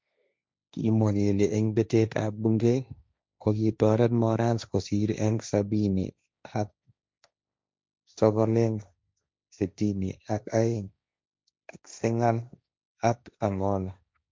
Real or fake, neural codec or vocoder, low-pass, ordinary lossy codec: fake; codec, 16 kHz, 1.1 kbps, Voila-Tokenizer; none; none